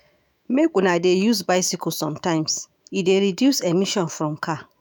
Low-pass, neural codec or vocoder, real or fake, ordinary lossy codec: none; autoencoder, 48 kHz, 128 numbers a frame, DAC-VAE, trained on Japanese speech; fake; none